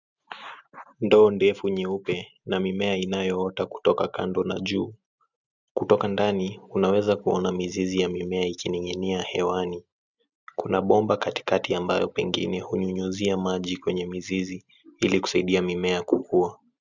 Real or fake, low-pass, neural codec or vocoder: real; 7.2 kHz; none